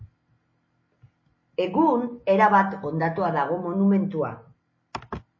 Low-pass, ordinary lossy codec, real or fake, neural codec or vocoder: 7.2 kHz; MP3, 32 kbps; real; none